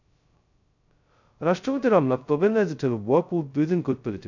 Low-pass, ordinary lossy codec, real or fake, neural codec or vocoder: 7.2 kHz; none; fake; codec, 16 kHz, 0.2 kbps, FocalCodec